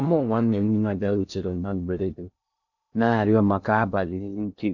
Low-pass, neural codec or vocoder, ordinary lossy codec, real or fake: 7.2 kHz; codec, 16 kHz in and 24 kHz out, 0.6 kbps, FocalCodec, streaming, 2048 codes; none; fake